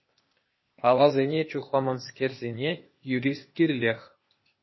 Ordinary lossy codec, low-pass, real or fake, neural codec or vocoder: MP3, 24 kbps; 7.2 kHz; fake; codec, 16 kHz, 0.8 kbps, ZipCodec